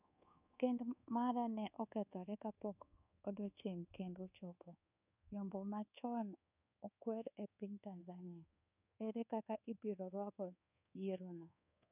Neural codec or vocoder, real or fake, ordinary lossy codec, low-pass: codec, 24 kHz, 1.2 kbps, DualCodec; fake; none; 3.6 kHz